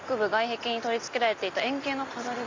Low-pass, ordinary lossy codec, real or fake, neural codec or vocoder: 7.2 kHz; MP3, 64 kbps; real; none